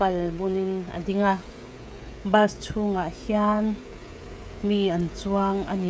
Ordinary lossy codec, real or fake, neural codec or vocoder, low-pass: none; fake; codec, 16 kHz, 16 kbps, FreqCodec, smaller model; none